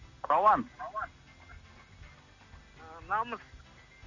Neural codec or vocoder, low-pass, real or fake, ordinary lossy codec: none; 7.2 kHz; real; AAC, 48 kbps